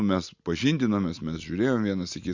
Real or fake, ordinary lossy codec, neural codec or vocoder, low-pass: real; Opus, 64 kbps; none; 7.2 kHz